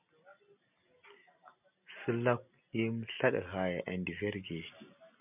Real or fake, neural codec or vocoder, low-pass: real; none; 3.6 kHz